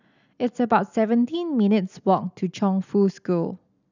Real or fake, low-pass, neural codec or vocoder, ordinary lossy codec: real; 7.2 kHz; none; none